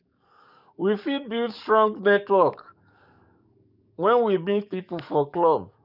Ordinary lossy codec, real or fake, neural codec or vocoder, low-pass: none; fake; codec, 44.1 kHz, 7.8 kbps, DAC; 5.4 kHz